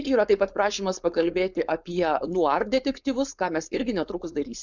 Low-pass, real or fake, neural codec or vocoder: 7.2 kHz; fake; codec, 16 kHz, 4.8 kbps, FACodec